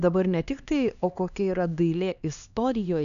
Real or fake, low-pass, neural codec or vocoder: fake; 7.2 kHz; codec, 16 kHz, 2 kbps, X-Codec, HuBERT features, trained on LibriSpeech